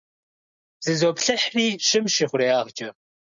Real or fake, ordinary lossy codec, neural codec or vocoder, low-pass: real; MP3, 64 kbps; none; 7.2 kHz